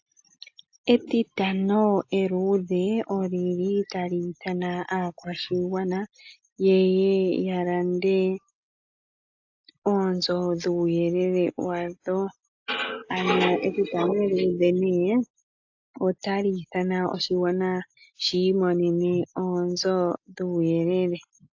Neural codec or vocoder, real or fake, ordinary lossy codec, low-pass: none; real; AAC, 48 kbps; 7.2 kHz